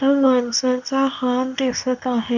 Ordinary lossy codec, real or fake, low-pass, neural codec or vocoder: none; fake; 7.2 kHz; codec, 24 kHz, 0.9 kbps, WavTokenizer, medium speech release version 2